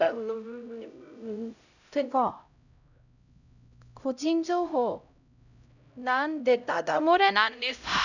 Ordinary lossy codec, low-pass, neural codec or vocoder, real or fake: none; 7.2 kHz; codec, 16 kHz, 0.5 kbps, X-Codec, HuBERT features, trained on LibriSpeech; fake